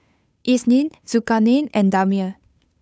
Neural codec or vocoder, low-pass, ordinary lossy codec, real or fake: codec, 16 kHz, 8 kbps, FunCodec, trained on Chinese and English, 25 frames a second; none; none; fake